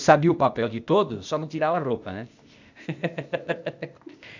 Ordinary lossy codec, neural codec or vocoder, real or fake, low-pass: none; codec, 16 kHz, 0.8 kbps, ZipCodec; fake; 7.2 kHz